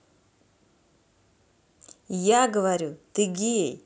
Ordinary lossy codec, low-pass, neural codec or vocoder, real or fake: none; none; none; real